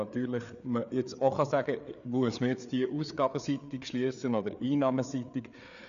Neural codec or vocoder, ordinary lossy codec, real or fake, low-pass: codec, 16 kHz, 4 kbps, FreqCodec, larger model; none; fake; 7.2 kHz